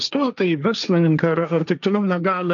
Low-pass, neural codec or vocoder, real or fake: 7.2 kHz; codec, 16 kHz, 1.1 kbps, Voila-Tokenizer; fake